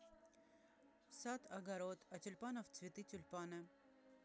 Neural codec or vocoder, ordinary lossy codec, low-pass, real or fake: none; none; none; real